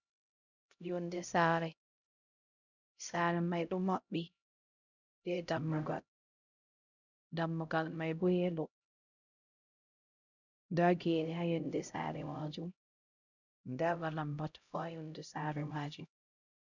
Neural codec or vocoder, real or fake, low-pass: codec, 16 kHz, 0.5 kbps, X-Codec, HuBERT features, trained on LibriSpeech; fake; 7.2 kHz